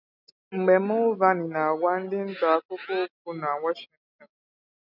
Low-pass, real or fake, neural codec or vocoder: 5.4 kHz; real; none